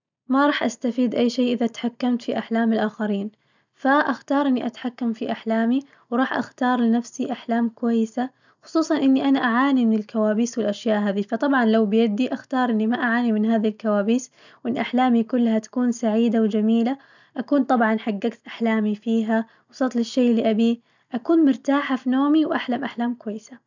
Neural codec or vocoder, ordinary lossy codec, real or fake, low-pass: none; none; real; 7.2 kHz